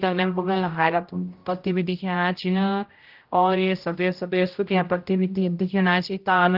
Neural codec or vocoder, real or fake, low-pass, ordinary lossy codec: codec, 16 kHz, 0.5 kbps, X-Codec, HuBERT features, trained on general audio; fake; 5.4 kHz; Opus, 32 kbps